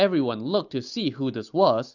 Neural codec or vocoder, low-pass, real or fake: none; 7.2 kHz; real